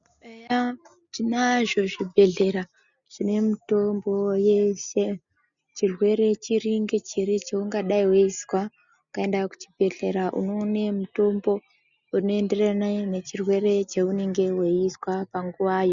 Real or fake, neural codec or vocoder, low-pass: real; none; 7.2 kHz